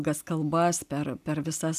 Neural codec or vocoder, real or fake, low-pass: none; real; 14.4 kHz